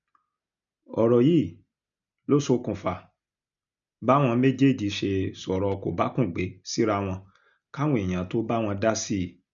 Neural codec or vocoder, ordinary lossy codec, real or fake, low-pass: none; none; real; 7.2 kHz